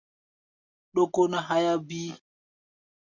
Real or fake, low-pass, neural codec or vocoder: real; 7.2 kHz; none